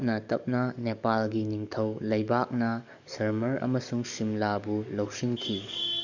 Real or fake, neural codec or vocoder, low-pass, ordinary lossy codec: fake; codec, 44.1 kHz, 7.8 kbps, DAC; 7.2 kHz; none